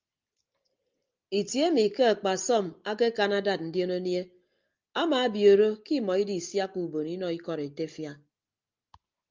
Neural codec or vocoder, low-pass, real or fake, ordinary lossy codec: none; 7.2 kHz; real; Opus, 24 kbps